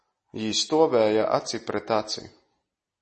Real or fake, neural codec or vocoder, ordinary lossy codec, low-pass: real; none; MP3, 32 kbps; 9.9 kHz